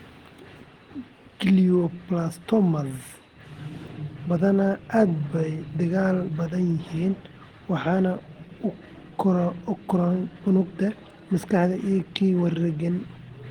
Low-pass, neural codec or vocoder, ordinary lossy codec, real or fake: 19.8 kHz; none; Opus, 16 kbps; real